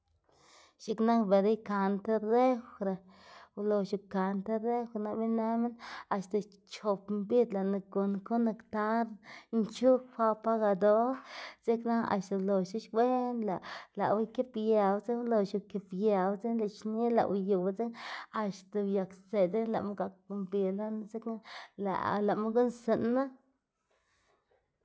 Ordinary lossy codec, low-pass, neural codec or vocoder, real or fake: none; none; none; real